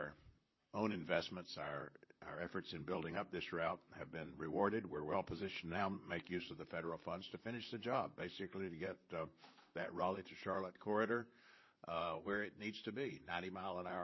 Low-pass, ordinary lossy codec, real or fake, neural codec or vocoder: 7.2 kHz; MP3, 24 kbps; fake; vocoder, 44.1 kHz, 128 mel bands, Pupu-Vocoder